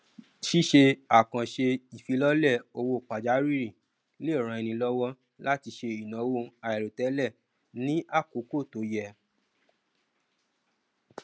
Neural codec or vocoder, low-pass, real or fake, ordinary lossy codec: none; none; real; none